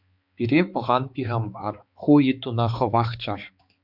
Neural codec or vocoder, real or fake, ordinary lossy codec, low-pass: codec, 16 kHz, 4 kbps, X-Codec, HuBERT features, trained on balanced general audio; fake; Opus, 64 kbps; 5.4 kHz